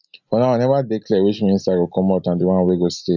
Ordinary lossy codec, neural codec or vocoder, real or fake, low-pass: none; none; real; 7.2 kHz